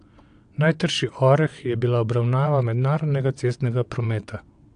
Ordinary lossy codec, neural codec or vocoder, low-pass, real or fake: AAC, 64 kbps; vocoder, 22.05 kHz, 80 mel bands, WaveNeXt; 9.9 kHz; fake